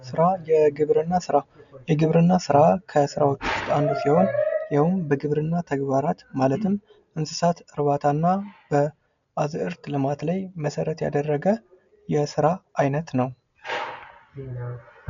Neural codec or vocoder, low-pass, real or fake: none; 7.2 kHz; real